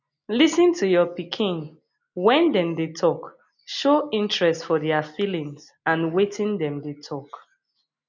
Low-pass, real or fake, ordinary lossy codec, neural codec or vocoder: 7.2 kHz; real; none; none